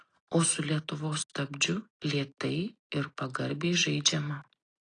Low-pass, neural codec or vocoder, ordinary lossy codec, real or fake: 9.9 kHz; none; AAC, 32 kbps; real